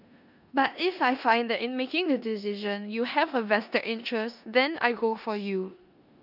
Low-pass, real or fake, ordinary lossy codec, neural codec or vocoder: 5.4 kHz; fake; none; codec, 16 kHz in and 24 kHz out, 0.9 kbps, LongCat-Audio-Codec, four codebook decoder